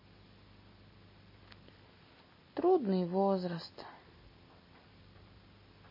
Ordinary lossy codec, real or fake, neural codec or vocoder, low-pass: MP3, 24 kbps; real; none; 5.4 kHz